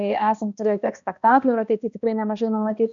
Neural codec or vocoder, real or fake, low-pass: codec, 16 kHz, 1 kbps, X-Codec, HuBERT features, trained on balanced general audio; fake; 7.2 kHz